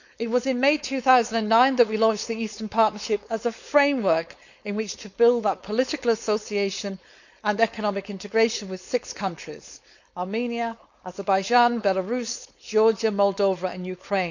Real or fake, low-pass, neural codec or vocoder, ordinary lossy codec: fake; 7.2 kHz; codec, 16 kHz, 4.8 kbps, FACodec; none